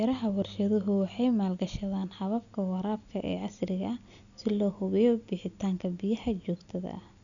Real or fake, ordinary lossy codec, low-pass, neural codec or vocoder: real; none; 7.2 kHz; none